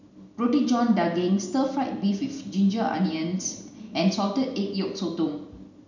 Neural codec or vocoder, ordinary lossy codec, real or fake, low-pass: none; none; real; 7.2 kHz